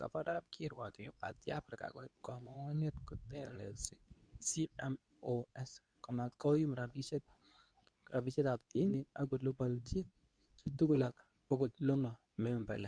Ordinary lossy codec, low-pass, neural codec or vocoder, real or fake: none; 9.9 kHz; codec, 24 kHz, 0.9 kbps, WavTokenizer, medium speech release version 2; fake